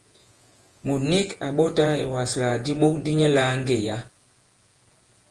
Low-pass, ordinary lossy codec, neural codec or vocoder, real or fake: 10.8 kHz; Opus, 24 kbps; vocoder, 48 kHz, 128 mel bands, Vocos; fake